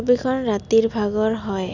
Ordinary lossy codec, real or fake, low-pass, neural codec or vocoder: none; real; 7.2 kHz; none